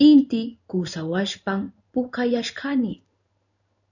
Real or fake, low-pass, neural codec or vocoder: fake; 7.2 kHz; codec, 16 kHz in and 24 kHz out, 1 kbps, XY-Tokenizer